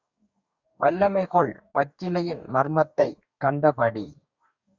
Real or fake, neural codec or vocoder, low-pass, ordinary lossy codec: fake; codec, 44.1 kHz, 2.6 kbps, DAC; 7.2 kHz; Opus, 64 kbps